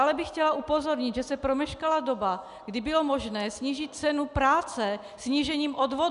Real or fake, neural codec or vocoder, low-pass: real; none; 10.8 kHz